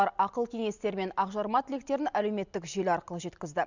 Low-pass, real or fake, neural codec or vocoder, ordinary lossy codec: 7.2 kHz; real; none; none